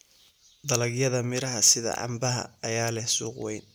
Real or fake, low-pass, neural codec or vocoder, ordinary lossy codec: real; none; none; none